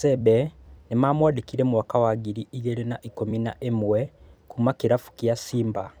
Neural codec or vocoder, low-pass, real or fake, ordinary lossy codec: vocoder, 44.1 kHz, 128 mel bands every 256 samples, BigVGAN v2; none; fake; none